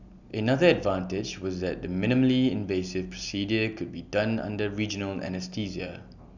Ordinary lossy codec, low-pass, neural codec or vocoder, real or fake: none; 7.2 kHz; none; real